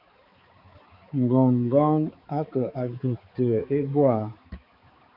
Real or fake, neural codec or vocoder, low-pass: fake; codec, 16 kHz, 4 kbps, X-Codec, HuBERT features, trained on balanced general audio; 5.4 kHz